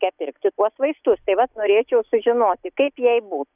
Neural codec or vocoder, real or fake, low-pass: none; real; 3.6 kHz